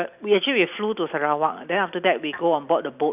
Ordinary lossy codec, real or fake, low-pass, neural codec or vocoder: none; real; 3.6 kHz; none